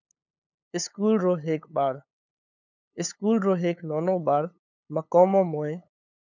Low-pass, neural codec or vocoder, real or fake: 7.2 kHz; codec, 16 kHz, 8 kbps, FunCodec, trained on LibriTTS, 25 frames a second; fake